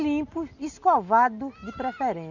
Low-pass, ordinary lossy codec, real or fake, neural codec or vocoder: 7.2 kHz; none; real; none